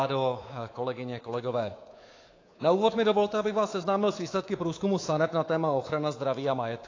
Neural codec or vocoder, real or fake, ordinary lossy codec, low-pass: codec, 24 kHz, 3.1 kbps, DualCodec; fake; AAC, 32 kbps; 7.2 kHz